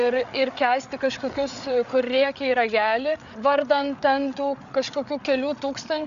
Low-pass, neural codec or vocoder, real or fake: 7.2 kHz; codec, 16 kHz, 8 kbps, FreqCodec, larger model; fake